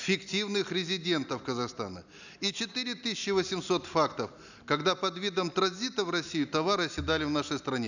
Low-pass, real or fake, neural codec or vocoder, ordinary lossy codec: 7.2 kHz; real; none; none